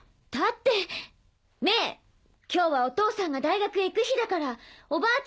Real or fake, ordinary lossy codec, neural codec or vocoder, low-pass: real; none; none; none